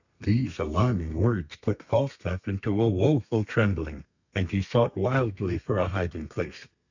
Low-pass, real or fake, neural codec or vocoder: 7.2 kHz; fake; codec, 32 kHz, 1.9 kbps, SNAC